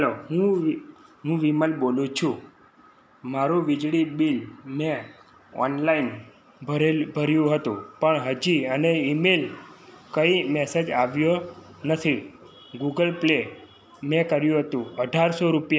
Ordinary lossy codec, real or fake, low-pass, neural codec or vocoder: none; real; none; none